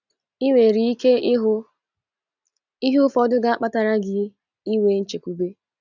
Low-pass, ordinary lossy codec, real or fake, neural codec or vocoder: 7.2 kHz; none; real; none